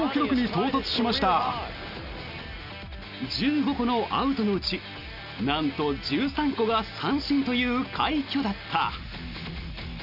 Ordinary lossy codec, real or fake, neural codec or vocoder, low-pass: none; real; none; 5.4 kHz